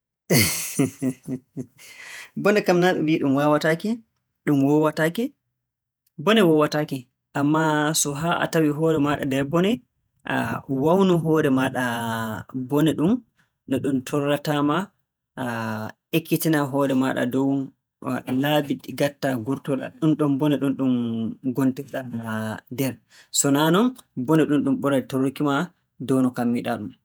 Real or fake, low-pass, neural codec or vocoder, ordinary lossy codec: fake; none; vocoder, 48 kHz, 128 mel bands, Vocos; none